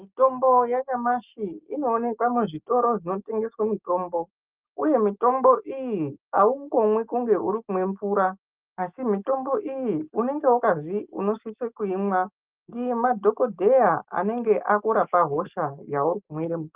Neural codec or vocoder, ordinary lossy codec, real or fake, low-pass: none; Opus, 32 kbps; real; 3.6 kHz